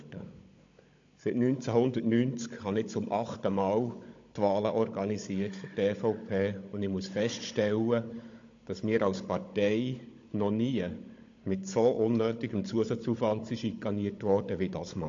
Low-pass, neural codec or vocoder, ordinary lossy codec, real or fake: 7.2 kHz; codec, 16 kHz, 16 kbps, FunCodec, trained on Chinese and English, 50 frames a second; AAC, 48 kbps; fake